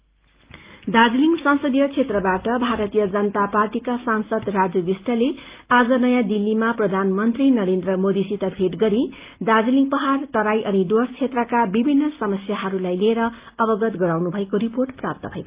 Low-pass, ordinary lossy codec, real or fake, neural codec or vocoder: 3.6 kHz; Opus, 32 kbps; real; none